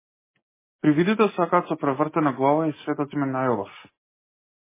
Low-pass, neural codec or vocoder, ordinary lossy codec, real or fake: 3.6 kHz; none; MP3, 16 kbps; real